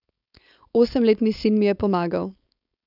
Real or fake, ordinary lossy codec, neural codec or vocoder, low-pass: fake; none; codec, 16 kHz, 4.8 kbps, FACodec; 5.4 kHz